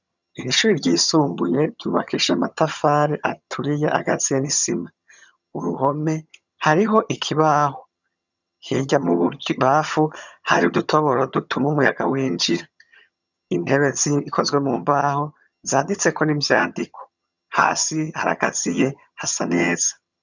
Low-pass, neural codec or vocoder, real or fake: 7.2 kHz; vocoder, 22.05 kHz, 80 mel bands, HiFi-GAN; fake